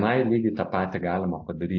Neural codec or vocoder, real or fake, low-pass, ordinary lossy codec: none; real; 7.2 kHz; AAC, 48 kbps